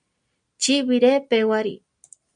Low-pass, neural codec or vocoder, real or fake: 9.9 kHz; none; real